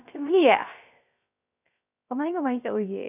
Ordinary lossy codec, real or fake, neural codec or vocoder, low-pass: none; fake; codec, 16 kHz, 0.3 kbps, FocalCodec; 3.6 kHz